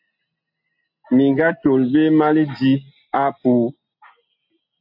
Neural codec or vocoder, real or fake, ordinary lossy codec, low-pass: none; real; AAC, 48 kbps; 5.4 kHz